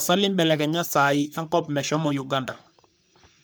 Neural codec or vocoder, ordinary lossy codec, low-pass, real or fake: codec, 44.1 kHz, 3.4 kbps, Pupu-Codec; none; none; fake